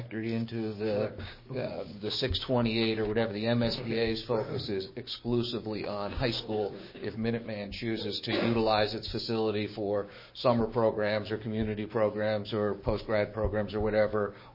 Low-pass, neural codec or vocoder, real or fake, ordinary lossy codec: 5.4 kHz; codec, 16 kHz, 6 kbps, DAC; fake; MP3, 24 kbps